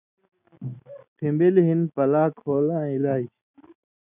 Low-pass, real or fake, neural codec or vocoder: 3.6 kHz; real; none